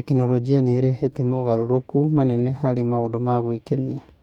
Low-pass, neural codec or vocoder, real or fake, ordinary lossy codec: 19.8 kHz; codec, 44.1 kHz, 2.6 kbps, DAC; fake; none